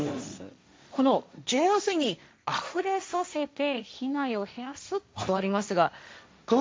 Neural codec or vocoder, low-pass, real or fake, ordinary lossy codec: codec, 16 kHz, 1.1 kbps, Voila-Tokenizer; none; fake; none